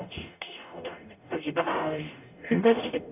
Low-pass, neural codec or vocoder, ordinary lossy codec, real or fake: 3.6 kHz; codec, 44.1 kHz, 0.9 kbps, DAC; none; fake